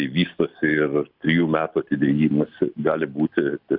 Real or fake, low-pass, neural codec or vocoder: real; 5.4 kHz; none